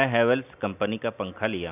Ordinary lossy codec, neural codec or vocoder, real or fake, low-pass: none; none; real; 3.6 kHz